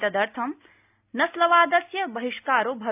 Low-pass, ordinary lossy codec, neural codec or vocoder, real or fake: 3.6 kHz; AAC, 32 kbps; none; real